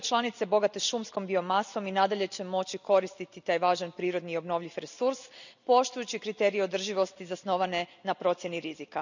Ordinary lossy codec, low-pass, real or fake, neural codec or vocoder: none; 7.2 kHz; real; none